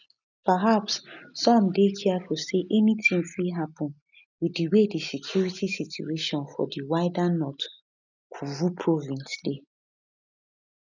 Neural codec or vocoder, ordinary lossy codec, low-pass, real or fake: none; none; 7.2 kHz; real